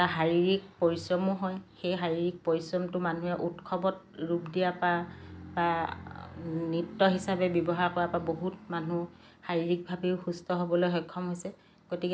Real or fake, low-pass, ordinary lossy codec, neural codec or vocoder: real; none; none; none